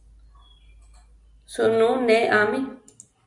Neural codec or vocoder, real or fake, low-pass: none; real; 10.8 kHz